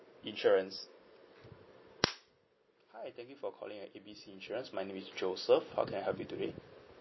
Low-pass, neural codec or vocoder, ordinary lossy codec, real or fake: 7.2 kHz; none; MP3, 24 kbps; real